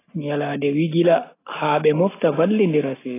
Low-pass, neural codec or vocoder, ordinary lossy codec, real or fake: 3.6 kHz; none; AAC, 16 kbps; real